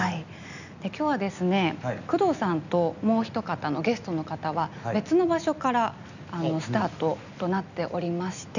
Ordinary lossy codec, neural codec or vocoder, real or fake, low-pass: none; none; real; 7.2 kHz